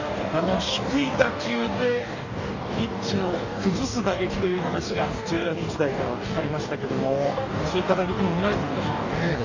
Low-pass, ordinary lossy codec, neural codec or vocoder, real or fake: 7.2 kHz; none; codec, 44.1 kHz, 2.6 kbps, DAC; fake